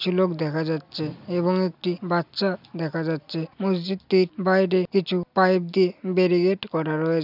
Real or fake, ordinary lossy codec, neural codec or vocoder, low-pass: real; none; none; 5.4 kHz